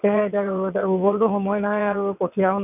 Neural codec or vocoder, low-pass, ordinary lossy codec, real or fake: vocoder, 22.05 kHz, 80 mel bands, WaveNeXt; 3.6 kHz; none; fake